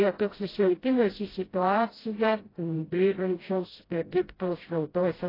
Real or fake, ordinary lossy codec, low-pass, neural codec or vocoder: fake; AAC, 24 kbps; 5.4 kHz; codec, 16 kHz, 0.5 kbps, FreqCodec, smaller model